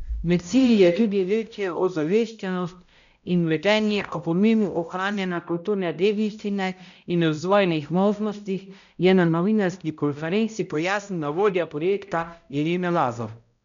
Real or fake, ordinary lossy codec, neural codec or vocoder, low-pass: fake; none; codec, 16 kHz, 0.5 kbps, X-Codec, HuBERT features, trained on balanced general audio; 7.2 kHz